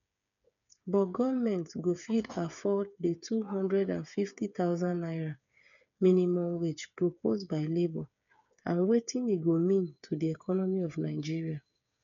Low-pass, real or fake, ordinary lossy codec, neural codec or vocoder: 7.2 kHz; fake; none; codec, 16 kHz, 8 kbps, FreqCodec, smaller model